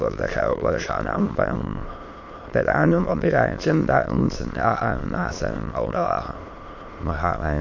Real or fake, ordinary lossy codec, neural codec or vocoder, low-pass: fake; MP3, 48 kbps; autoencoder, 22.05 kHz, a latent of 192 numbers a frame, VITS, trained on many speakers; 7.2 kHz